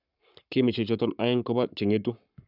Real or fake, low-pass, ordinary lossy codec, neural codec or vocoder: fake; 5.4 kHz; none; codec, 44.1 kHz, 7.8 kbps, Pupu-Codec